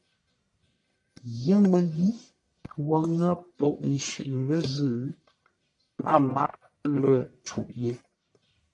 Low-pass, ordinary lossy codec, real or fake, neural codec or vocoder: 10.8 kHz; MP3, 96 kbps; fake; codec, 44.1 kHz, 1.7 kbps, Pupu-Codec